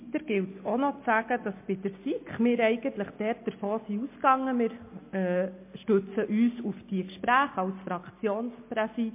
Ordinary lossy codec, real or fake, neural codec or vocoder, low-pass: MP3, 24 kbps; real; none; 3.6 kHz